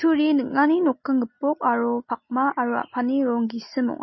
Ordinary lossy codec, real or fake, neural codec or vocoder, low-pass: MP3, 24 kbps; real; none; 7.2 kHz